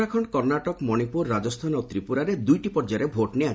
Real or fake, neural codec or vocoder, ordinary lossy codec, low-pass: real; none; none; none